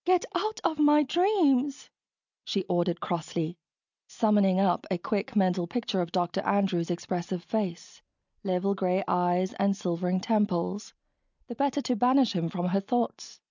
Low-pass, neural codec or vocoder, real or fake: 7.2 kHz; none; real